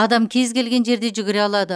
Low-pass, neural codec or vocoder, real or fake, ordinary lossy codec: none; none; real; none